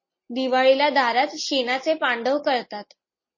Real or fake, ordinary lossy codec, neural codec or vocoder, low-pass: real; MP3, 32 kbps; none; 7.2 kHz